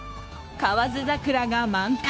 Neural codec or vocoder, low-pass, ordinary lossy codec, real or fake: codec, 16 kHz, 0.9 kbps, LongCat-Audio-Codec; none; none; fake